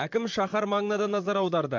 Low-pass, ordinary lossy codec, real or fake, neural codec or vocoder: 7.2 kHz; MP3, 64 kbps; fake; vocoder, 44.1 kHz, 128 mel bands, Pupu-Vocoder